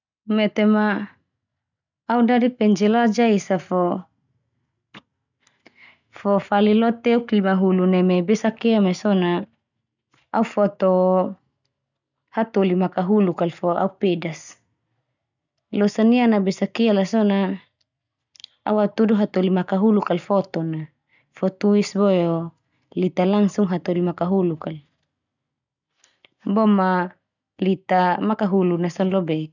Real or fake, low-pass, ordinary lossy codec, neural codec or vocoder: real; 7.2 kHz; none; none